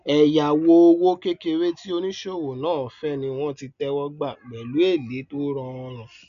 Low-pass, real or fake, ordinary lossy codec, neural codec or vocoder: 7.2 kHz; real; none; none